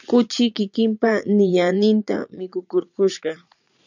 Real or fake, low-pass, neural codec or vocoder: fake; 7.2 kHz; vocoder, 44.1 kHz, 80 mel bands, Vocos